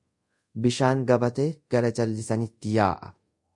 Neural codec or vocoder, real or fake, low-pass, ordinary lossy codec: codec, 24 kHz, 0.5 kbps, DualCodec; fake; 10.8 kHz; MP3, 48 kbps